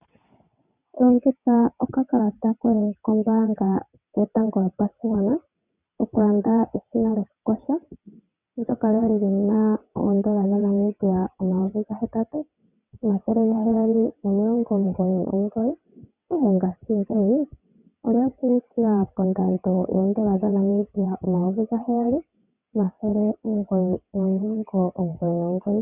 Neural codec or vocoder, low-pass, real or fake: vocoder, 22.05 kHz, 80 mel bands, WaveNeXt; 3.6 kHz; fake